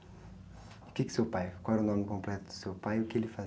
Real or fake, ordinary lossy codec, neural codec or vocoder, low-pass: real; none; none; none